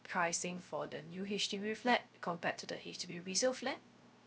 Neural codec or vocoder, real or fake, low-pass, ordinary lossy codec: codec, 16 kHz, 0.3 kbps, FocalCodec; fake; none; none